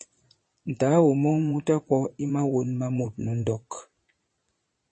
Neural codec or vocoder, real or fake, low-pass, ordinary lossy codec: vocoder, 22.05 kHz, 80 mel bands, Vocos; fake; 9.9 kHz; MP3, 32 kbps